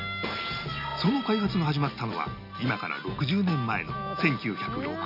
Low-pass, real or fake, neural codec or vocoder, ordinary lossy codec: 5.4 kHz; real; none; none